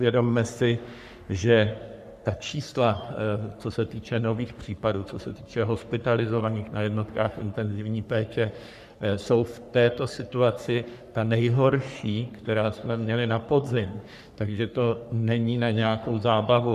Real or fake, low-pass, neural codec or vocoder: fake; 14.4 kHz; codec, 44.1 kHz, 3.4 kbps, Pupu-Codec